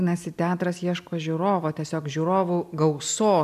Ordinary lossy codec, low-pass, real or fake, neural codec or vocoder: AAC, 96 kbps; 14.4 kHz; real; none